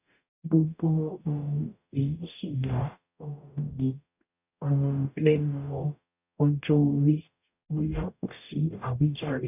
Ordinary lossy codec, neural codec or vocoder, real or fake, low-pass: none; codec, 44.1 kHz, 0.9 kbps, DAC; fake; 3.6 kHz